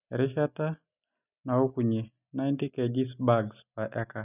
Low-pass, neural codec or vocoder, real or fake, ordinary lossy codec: 3.6 kHz; none; real; none